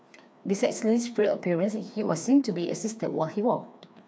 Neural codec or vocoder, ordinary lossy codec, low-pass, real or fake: codec, 16 kHz, 2 kbps, FreqCodec, larger model; none; none; fake